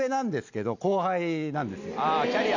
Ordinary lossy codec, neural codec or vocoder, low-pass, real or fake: none; none; 7.2 kHz; real